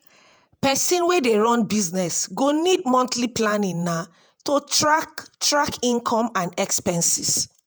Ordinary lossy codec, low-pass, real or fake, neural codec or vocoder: none; none; fake; vocoder, 48 kHz, 128 mel bands, Vocos